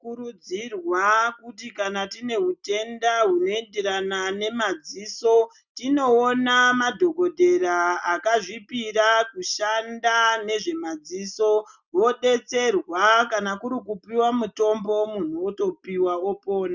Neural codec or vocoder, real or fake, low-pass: none; real; 7.2 kHz